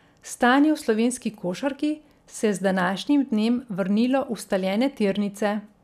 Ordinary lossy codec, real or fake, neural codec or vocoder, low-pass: none; real; none; 14.4 kHz